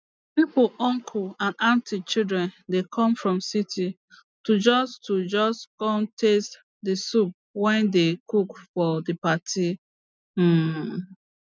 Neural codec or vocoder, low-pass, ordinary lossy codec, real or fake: none; none; none; real